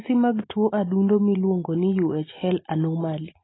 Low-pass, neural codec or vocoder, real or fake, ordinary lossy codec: 7.2 kHz; none; real; AAC, 16 kbps